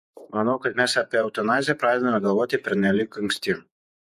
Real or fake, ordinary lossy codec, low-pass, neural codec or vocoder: fake; MP3, 64 kbps; 14.4 kHz; vocoder, 48 kHz, 128 mel bands, Vocos